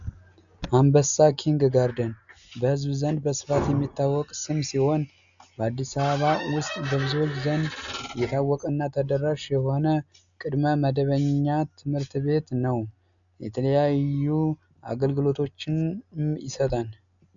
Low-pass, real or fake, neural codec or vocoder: 7.2 kHz; real; none